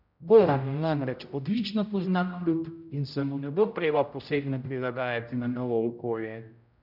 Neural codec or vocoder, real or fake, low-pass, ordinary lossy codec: codec, 16 kHz, 0.5 kbps, X-Codec, HuBERT features, trained on general audio; fake; 5.4 kHz; none